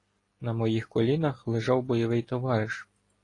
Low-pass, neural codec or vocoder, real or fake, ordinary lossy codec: 10.8 kHz; none; real; AAC, 32 kbps